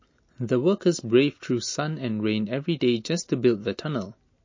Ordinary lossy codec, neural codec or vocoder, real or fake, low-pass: MP3, 32 kbps; none; real; 7.2 kHz